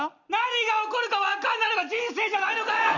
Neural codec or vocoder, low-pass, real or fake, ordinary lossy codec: none; 7.2 kHz; real; Opus, 64 kbps